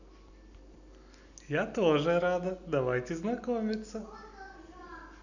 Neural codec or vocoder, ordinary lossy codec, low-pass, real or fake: none; none; 7.2 kHz; real